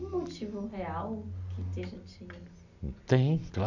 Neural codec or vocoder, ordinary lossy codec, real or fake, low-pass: none; none; real; 7.2 kHz